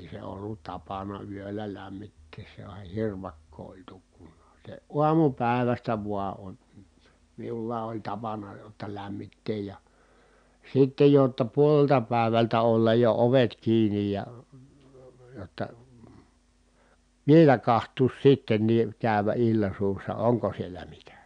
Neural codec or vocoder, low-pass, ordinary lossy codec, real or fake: none; 9.9 kHz; MP3, 96 kbps; real